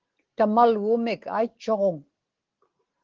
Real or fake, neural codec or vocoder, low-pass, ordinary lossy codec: real; none; 7.2 kHz; Opus, 16 kbps